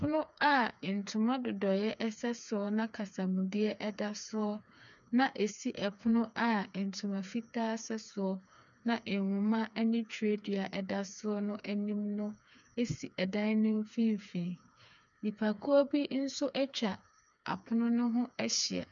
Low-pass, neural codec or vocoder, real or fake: 7.2 kHz; codec, 16 kHz, 4 kbps, FreqCodec, smaller model; fake